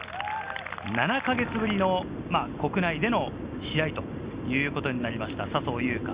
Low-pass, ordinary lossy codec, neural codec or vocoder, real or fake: 3.6 kHz; Opus, 64 kbps; none; real